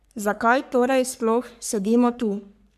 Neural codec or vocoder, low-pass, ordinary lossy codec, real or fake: codec, 44.1 kHz, 3.4 kbps, Pupu-Codec; 14.4 kHz; none; fake